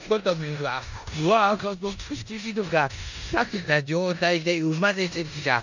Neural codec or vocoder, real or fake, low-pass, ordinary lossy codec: codec, 16 kHz in and 24 kHz out, 0.9 kbps, LongCat-Audio-Codec, four codebook decoder; fake; 7.2 kHz; none